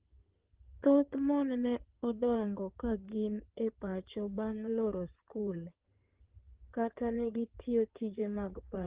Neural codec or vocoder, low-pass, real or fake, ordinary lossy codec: codec, 16 kHz in and 24 kHz out, 2.2 kbps, FireRedTTS-2 codec; 3.6 kHz; fake; Opus, 16 kbps